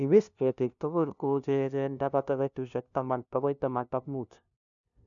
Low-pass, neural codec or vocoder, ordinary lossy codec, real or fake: 7.2 kHz; codec, 16 kHz, 0.5 kbps, FunCodec, trained on LibriTTS, 25 frames a second; none; fake